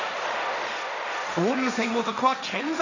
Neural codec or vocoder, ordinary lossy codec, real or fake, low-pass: codec, 16 kHz, 1.1 kbps, Voila-Tokenizer; none; fake; 7.2 kHz